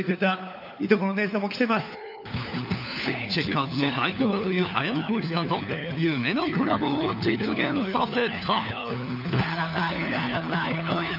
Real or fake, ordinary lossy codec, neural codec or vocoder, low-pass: fake; none; codec, 16 kHz, 4 kbps, FunCodec, trained on LibriTTS, 50 frames a second; 5.4 kHz